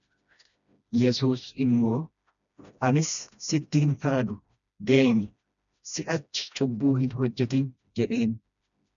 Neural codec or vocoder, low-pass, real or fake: codec, 16 kHz, 1 kbps, FreqCodec, smaller model; 7.2 kHz; fake